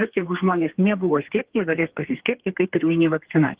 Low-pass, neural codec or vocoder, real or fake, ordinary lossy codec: 3.6 kHz; codec, 32 kHz, 1.9 kbps, SNAC; fake; Opus, 16 kbps